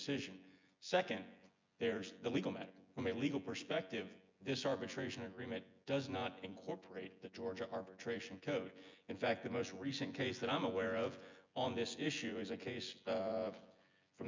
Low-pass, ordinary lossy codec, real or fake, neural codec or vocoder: 7.2 kHz; MP3, 64 kbps; fake; vocoder, 24 kHz, 100 mel bands, Vocos